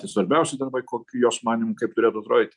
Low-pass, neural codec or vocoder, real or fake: 10.8 kHz; none; real